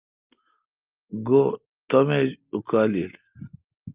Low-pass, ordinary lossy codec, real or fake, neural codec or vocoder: 3.6 kHz; Opus, 24 kbps; real; none